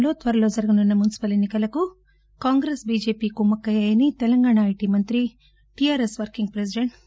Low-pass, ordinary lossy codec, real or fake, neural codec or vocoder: none; none; real; none